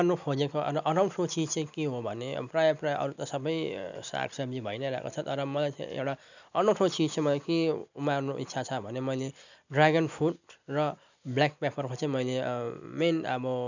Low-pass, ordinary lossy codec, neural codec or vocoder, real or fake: 7.2 kHz; none; none; real